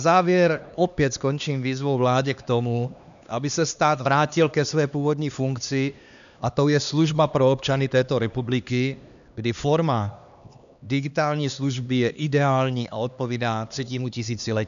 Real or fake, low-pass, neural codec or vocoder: fake; 7.2 kHz; codec, 16 kHz, 2 kbps, X-Codec, HuBERT features, trained on LibriSpeech